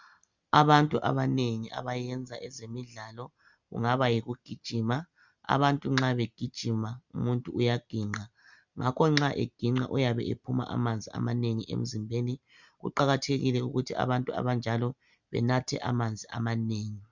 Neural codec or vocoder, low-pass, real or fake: none; 7.2 kHz; real